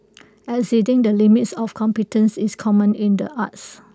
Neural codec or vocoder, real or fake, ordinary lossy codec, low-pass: none; real; none; none